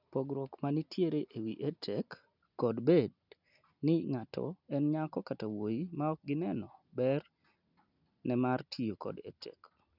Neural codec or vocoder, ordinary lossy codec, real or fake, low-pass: none; none; real; 5.4 kHz